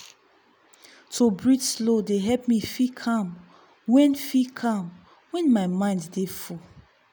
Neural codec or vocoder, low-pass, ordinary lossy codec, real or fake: none; none; none; real